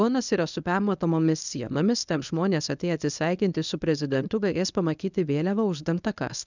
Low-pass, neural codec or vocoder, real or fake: 7.2 kHz; codec, 24 kHz, 0.9 kbps, WavTokenizer, medium speech release version 1; fake